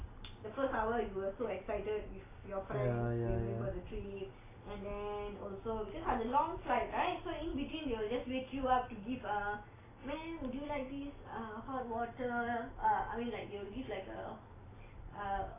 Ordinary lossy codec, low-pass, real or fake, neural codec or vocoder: AAC, 16 kbps; 3.6 kHz; real; none